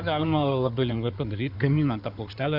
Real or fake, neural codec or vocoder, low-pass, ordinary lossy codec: fake; codec, 16 kHz in and 24 kHz out, 2.2 kbps, FireRedTTS-2 codec; 5.4 kHz; AAC, 48 kbps